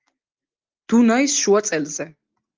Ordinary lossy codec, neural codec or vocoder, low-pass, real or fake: Opus, 32 kbps; none; 7.2 kHz; real